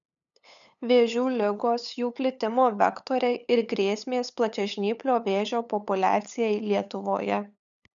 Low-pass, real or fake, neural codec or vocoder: 7.2 kHz; fake; codec, 16 kHz, 8 kbps, FunCodec, trained on LibriTTS, 25 frames a second